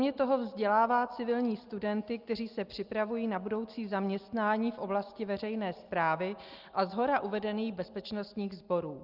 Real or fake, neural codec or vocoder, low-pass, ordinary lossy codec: real; none; 5.4 kHz; Opus, 24 kbps